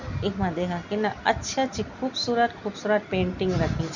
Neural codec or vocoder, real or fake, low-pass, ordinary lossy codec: none; real; 7.2 kHz; none